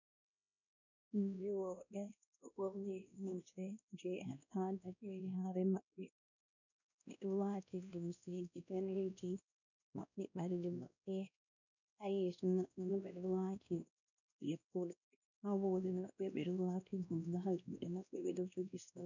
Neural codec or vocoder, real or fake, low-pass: codec, 16 kHz, 1 kbps, X-Codec, WavLM features, trained on Multilingual LibriSpeech; fake; 7.2 kHz